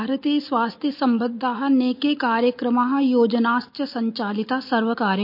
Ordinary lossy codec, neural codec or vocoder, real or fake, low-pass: MP3, 32 kbps; none; real; 5.4 kHz